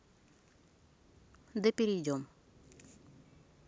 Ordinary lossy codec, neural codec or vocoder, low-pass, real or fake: none; none; none; real